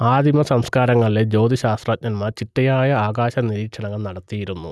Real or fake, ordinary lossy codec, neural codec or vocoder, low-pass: real; none; none; none